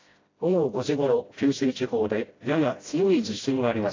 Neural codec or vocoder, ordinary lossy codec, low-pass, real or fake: codec, 16 kHz, 0.5 kbps, FreqCodec, smaller model; AAC, 32 kbps; 7.2 kHz; fake